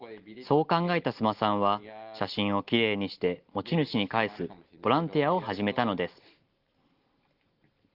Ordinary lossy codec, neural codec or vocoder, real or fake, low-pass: Opus, 16 kbps; none; real; 5.4 kHz